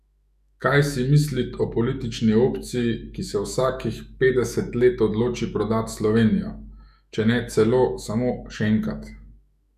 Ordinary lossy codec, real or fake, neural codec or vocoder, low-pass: none; fake; autoencoder, 48 kHz, 128 numbers a frame, DAC-VAE, trained on Japanese speech; 14.4 kHz